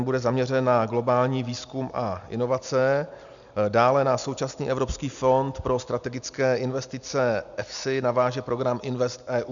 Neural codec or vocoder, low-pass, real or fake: none; 7.2 kHz; real